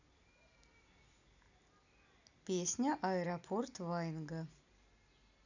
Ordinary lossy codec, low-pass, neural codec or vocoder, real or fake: none; 7.2 kHz; none; real